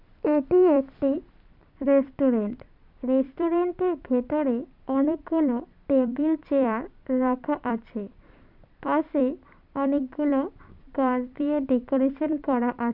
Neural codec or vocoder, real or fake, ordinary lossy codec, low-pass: vocoder, 44.1 kHz, 128 mel bands every 256 samples, BigVGAN v2; fake; none; 5.4 kHz